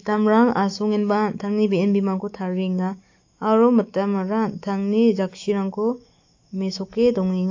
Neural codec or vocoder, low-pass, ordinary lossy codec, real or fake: autoencoder, 48 kHz, 128 numbers a frame, DAC-VAE, trained on Japanese speech; 7.2 kHz; AAC, 48 kbps; fake